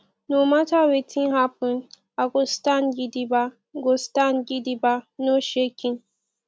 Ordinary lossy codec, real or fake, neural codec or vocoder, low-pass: none; real; none; none